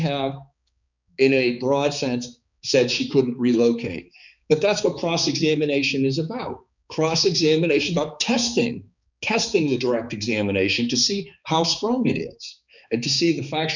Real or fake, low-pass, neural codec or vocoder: fake; 7.2 kHz; codec, 16 kHz, 4 kbps, X-Codec, HuBERT features, trained on balanced general audio